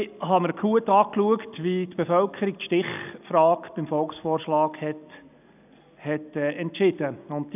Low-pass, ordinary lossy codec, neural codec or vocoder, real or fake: 3.6 kHz; none; none; real